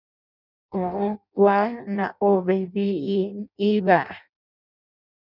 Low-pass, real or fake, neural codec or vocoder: 5.4 kHz; fake; codec, 16 kHz in and 24 kHz out, 0.6 kbps, FireRedTTS-2 codec